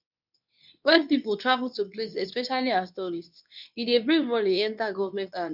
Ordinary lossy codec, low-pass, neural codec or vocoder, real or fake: none; 5.4 kHz; codec, 24 kHz, 0.9 kbps, WavTokenizer, medium speech release version 2; fake